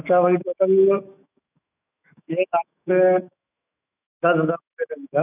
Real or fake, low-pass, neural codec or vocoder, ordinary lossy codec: fake; 3.6 kHz; autoencoder, 48 kHz, 128 numbers a frame, DAC-VAE, trained on Japanese speech; none